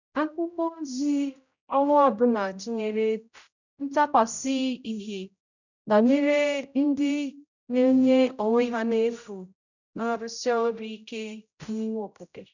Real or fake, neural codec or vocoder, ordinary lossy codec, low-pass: fake; codec, 16 kHz, 0.5 kbps, X-Codec, HuBERT features, trained on general audio; none; 7.2 kHz